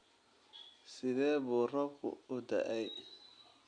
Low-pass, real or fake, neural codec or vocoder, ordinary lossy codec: 9.9 kHz; real; none; none